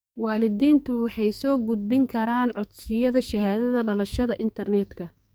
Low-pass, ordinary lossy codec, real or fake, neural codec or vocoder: none; none; fake; codec, 44.1 kHz, 2.6 kbps, SNAC